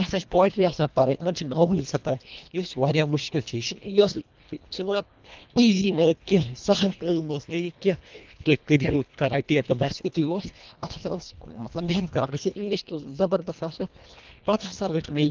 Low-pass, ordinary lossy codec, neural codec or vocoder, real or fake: 7.2 kHz; Opus, 24 kbps; codec, 24 kHz, 1.5 kbps, HILCodec; fake